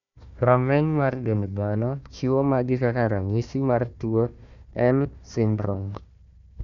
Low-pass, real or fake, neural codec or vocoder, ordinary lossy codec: 7.2 kHz; fake; codec, 16 kHz, 1 kbps, FunCodec, trained on Chinese and English, 50 frames a second; none